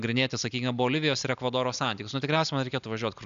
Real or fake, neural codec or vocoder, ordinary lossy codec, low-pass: real; none; AAC, 96 kbps; 7.2 kHz